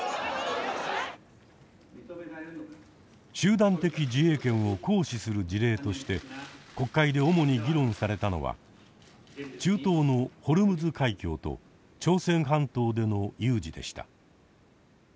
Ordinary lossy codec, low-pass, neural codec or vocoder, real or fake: none; none; none; real